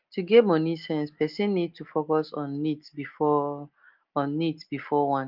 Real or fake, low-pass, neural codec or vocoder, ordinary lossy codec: real; 5.4 kHz; none; Opus, 24 kbps